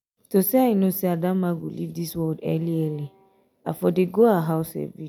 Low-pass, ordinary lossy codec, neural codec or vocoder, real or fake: none; none; none; real